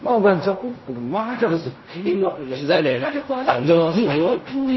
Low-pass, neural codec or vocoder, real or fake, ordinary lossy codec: 7.2 kHz; codec, 16 kHz in and 24 kHz out, 0.4 kbps, LongCat-Audio-Codec, fine tuned four codebook decoder; fake; MP3, 24 kbps